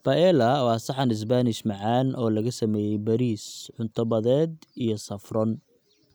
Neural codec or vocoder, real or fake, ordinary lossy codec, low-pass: none; real; none; none